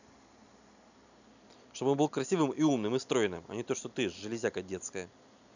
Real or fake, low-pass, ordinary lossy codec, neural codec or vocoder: real; 7.2 kHz; none; none